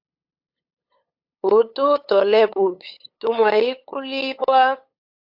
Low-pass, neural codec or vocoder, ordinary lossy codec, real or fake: 5.4 kHz; codec, 16 kHz, 8 kbps, FunCodec, trained on LibriTTS, 25 frames a second; AAC, 48 kbps; fake